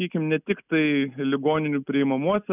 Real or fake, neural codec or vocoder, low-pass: real; none; 3.6 kHz